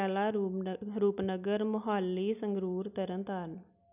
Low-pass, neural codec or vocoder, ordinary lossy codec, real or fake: 3.6 kHz; none; none; real